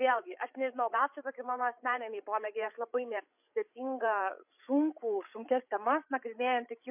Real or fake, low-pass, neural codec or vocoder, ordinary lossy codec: fake; 3.6 kHz; codec, 16 kHz, 8 kbps, FreqCodec, larger model; MP3, 32 kbps